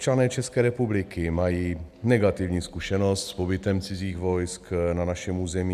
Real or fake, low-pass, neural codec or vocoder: real; 14.4 kHz; none